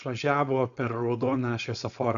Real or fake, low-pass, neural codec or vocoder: fake; 7.2 kHz; codec, 16 kHz, 4.8 kbps, FACodec